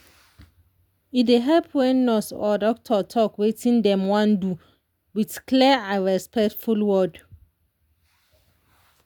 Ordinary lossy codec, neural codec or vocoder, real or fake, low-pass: none; none; real; 19.8 kHz